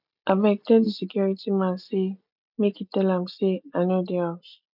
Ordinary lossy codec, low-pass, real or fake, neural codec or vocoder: none; 5.4 kHz; real; none